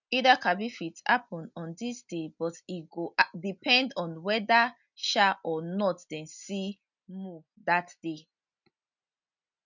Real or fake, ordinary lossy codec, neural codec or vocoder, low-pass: real; none; none; 7.2 kHz